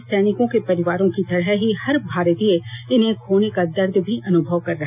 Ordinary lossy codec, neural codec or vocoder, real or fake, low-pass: none; none; real; 3.6 kHz